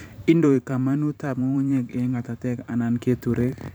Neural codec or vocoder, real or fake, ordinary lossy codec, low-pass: vocoder, 44.1 kHz, 128 mel bands every 512 samples, BigVGAN v2; fake; none; none